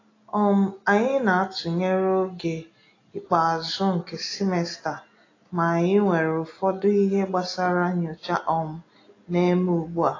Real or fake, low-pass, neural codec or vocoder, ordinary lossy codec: real; 7.2 kHz; none; AAC, 32 kbps